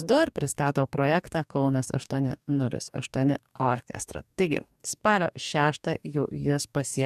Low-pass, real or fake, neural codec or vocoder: 14.4 kHz; fake; codec, 44.1 kHz, 2.6 kbps, DAC